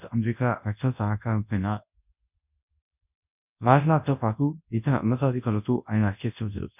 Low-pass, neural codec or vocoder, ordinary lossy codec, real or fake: 3.6 kHz; codec, 24 kHz, 0.9 kbps, WavTokenizer, large speech release; none; fake